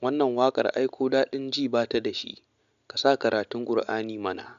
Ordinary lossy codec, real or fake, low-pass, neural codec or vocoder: none; real; 7.2 kHz; none